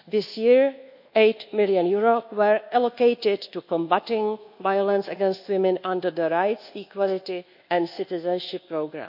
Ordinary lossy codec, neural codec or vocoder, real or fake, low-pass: none; codec, 24 kHz, 1.2 kbps, DualCodec; fake; 5.4 kHz